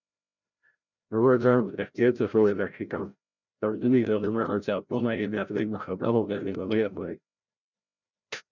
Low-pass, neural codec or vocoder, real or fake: 7.2 kHz; codec, 16 kHz, 0.5 kbps, FreqCodec, larger model; fake